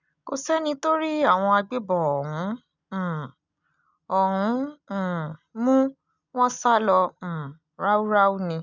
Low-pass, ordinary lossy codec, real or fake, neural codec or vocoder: 7.2 kHz; none; real; none